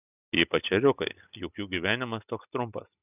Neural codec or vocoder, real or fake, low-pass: none; real; 3.6 kHz